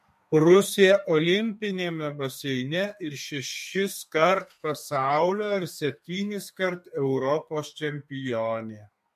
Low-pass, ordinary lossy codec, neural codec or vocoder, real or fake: 14.4 kHz; MP3, 64 kbps; codec, 32 kHz, 1.9 kbps, SNAC; fake